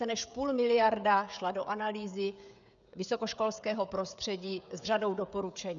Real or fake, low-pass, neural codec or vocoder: fake; 7.2 kHz; codec, 16 kHz, 16 kbps, FreqCodec, smaller model